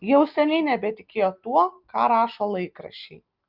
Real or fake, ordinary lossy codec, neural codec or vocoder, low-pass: real; Opus, 32 kbps; none; 5.4 kHz